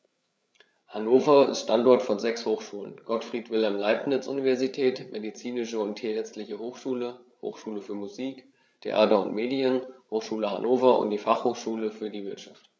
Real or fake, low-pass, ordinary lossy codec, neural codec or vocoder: fake; none; none; codec, 16 kHz, 8 kbps, FreqCodec, larger model